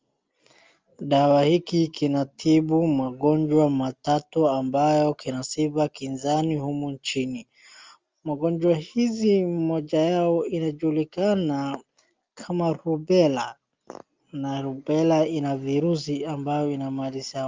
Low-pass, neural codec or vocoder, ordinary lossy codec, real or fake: 7.2 kHz; none; Opus, 32 kbps; real